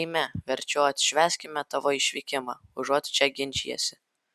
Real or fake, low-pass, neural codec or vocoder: real; 14.4 kHz; none